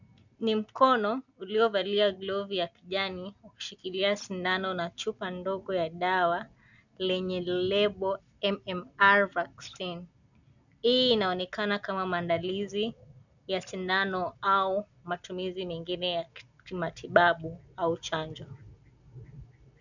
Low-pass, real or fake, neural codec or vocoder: 7.2 kHz; real; none